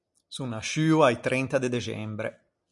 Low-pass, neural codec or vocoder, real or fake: 10.8 kHz; none; real